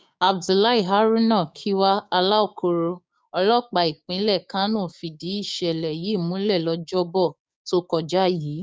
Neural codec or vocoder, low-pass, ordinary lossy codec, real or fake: codec, 16 kHz, 6 kbps, DAC; none; none; fake